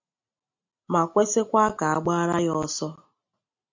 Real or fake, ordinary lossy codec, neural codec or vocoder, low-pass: real; MP3, 48 kbps; none; 7.2 kHz